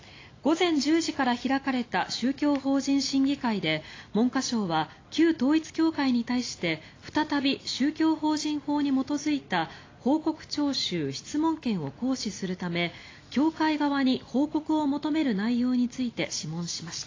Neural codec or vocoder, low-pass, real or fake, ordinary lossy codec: none; 7.2 kHz; real; AAC, 32 kbps